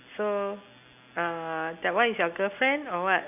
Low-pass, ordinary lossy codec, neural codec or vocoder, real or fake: 3.6 kHz; none; none; real